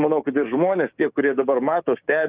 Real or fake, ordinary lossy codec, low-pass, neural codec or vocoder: fake; Opus, 32 kbps; 3.6 kHz; codec, 16 kHz, 6 kbps, DAC